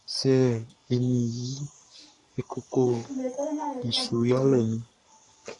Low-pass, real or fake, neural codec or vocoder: 10.8 kHz; fake; codec, 44.1 kHz, 3.4 kbps, Pupu-Codec